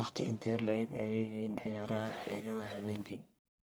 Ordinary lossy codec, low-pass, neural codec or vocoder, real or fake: none; none; codec, 44.1 kHz, 1.7 kbps, Pupu-Codec; fake